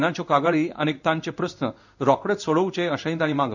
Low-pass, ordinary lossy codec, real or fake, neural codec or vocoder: 7.2 kHz; none; fake; codec, 16 kHz in and 24 kHz out, 1 kbps, XY-Tokenizer